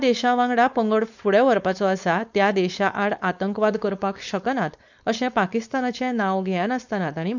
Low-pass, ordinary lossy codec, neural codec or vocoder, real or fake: 7.2 kHz; none; codec, 16 kHz, 4.8 kbps, FACodec; fake